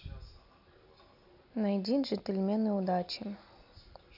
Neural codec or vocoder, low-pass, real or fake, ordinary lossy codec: none; 5.4 kHz; real; AAC, 48 kbps